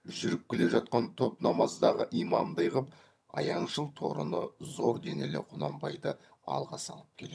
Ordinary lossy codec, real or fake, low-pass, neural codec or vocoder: none; fake; none; vocoder, 22.05 kHz, 80 mel bands, HiFi-GAN